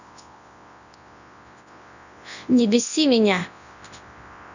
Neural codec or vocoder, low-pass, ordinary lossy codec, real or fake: codec, 24 kHz, 0.9 kbps, WavTokenizer, large speech release; 7.2 kHz; none; fake